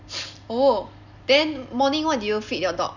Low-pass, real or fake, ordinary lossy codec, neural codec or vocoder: 7.2 kHz; real; none; none